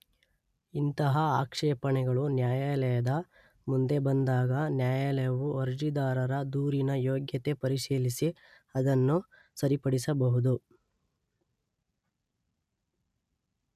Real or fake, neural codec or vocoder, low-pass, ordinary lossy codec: real; none; 14.4 kHz; none